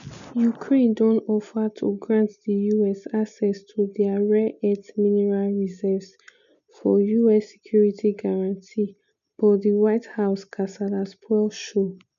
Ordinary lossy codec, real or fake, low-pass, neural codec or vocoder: none; real; 7.2 kHz; none